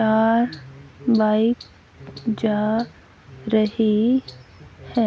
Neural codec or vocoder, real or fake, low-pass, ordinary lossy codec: none; real; none; none